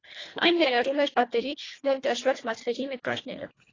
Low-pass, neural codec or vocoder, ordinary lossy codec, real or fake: 7.2 kHz; codec, 24 kHz, 1.5 kbps, HILCodec; AAC, 32 kbps; fake